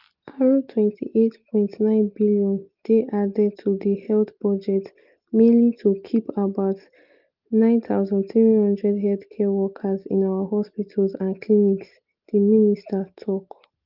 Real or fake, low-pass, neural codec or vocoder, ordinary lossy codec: real; 5.4 kHz; none; Opus, 32 kbps